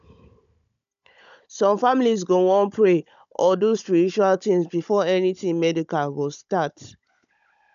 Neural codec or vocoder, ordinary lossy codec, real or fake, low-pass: codec, 16 kHz, 16 kbps, FunCodec, trained on Chinese and English, 50 frames a second; none; fake; 7.2 kHz